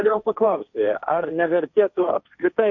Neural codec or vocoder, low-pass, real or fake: codec, 16 kHz, 1.1 kbps, Voila-Tokenizer; 7.2 kHz; fake